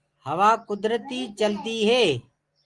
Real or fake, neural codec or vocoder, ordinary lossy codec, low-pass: real; none; Opus, 24 kbps; 10.8 kHz